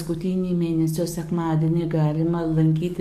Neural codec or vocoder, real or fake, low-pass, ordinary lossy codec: codec, 44.1 kHz, 7.8 kbps, DAC; fake; 14.4 kHz; MP3, 64 kbps